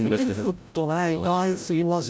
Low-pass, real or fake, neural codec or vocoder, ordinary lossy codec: none; fake; codec, 16 kHz, 0.5 kbps, FreqCodec, larger model; none